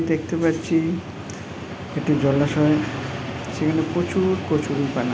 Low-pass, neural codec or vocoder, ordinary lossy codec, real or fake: none; none; none; real